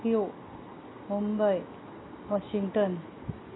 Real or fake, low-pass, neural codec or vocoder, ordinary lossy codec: real; 7.2 kHz; none; AAC, 16 kbps